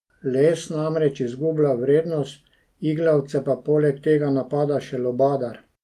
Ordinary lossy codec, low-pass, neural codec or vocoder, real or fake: Opus, 32 kbps; 14.4 kHz; none; real